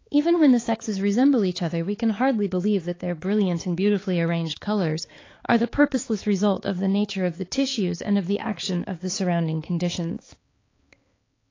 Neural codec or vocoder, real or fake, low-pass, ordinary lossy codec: codec, 16 kHz, 4 kbps, X-Codec, HuBERT features, trained on balanced general audio; fake; 7.2 kHz; AAC, 32 kbps